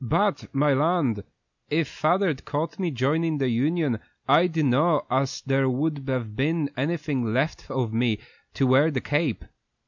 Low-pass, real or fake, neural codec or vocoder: 7.2 kHz; real; none